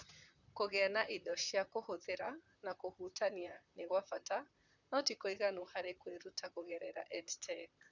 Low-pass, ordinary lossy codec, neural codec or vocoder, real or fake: 7.2 kHz; none; vocoder, 44.1 kHz, 128 mel bands, Pupu-Vocoder; fake